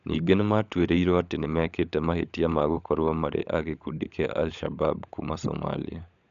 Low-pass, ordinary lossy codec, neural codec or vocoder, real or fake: 7.2 kHz; none; codec, 16 kHz, 16 kbps, FunCodec, trained on LibriTTS, 50 frames a second; fake